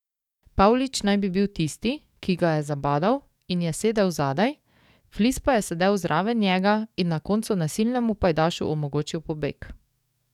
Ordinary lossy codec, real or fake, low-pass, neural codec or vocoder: none; fake; 19.8 kHz; codec, 44.1 kHz, 7.8 kbps, DAC